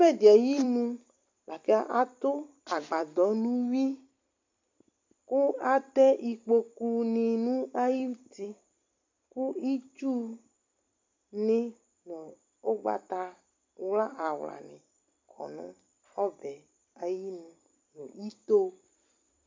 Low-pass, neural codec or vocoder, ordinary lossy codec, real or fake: 7.2 kHz; vocoder, 44.1 kHz, 128 mel bands every 256 samples, BigVGAN v2; MP3, 64 kbps; fake